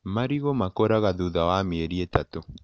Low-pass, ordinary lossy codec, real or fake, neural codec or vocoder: none; none; real; none